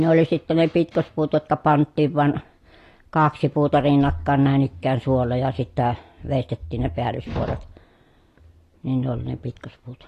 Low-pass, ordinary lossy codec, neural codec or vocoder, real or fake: 14.4 kHz; AAC, 48 kbps; none; real